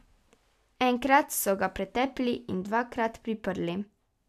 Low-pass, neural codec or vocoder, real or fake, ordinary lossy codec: 14.4 kHz; none; real; none